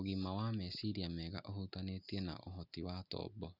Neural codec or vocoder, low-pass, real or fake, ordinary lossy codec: none; 5.4 kHz; real; Opus, 64 kbps